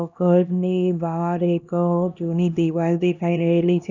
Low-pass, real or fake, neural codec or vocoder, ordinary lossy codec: 7.2 kHz; fake; codec, 16 kHz, 1 kbps, X-Codec, HuBERT features, trained on LibriSpeech; none